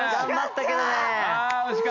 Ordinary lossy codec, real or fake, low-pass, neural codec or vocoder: none; real; 7.2 kHz; none